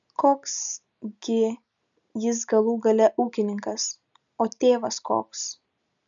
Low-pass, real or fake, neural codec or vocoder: 7.2 kHz; real; none